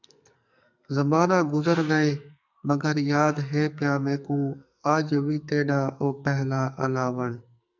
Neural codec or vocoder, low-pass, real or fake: codec, 44.1 kHz, 2.6 kbps, SNAC; 7.2 kHz; fake